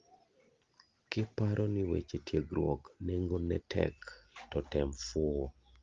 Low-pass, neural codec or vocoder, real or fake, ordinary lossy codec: 7.2 kHz; none; real; Opus, 32 kbps